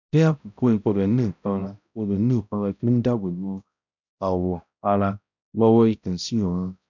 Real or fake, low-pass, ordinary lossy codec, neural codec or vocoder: fake; 7.2 kHz; none; codec, 16 kHz, 0.5 kbps, X-Codec, HuBERT features, trained on balanced general audio